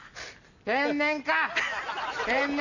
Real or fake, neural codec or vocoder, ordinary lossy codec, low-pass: real; none; none; 7.2 kHz